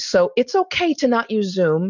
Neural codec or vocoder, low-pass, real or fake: none; 7.2 kHz; real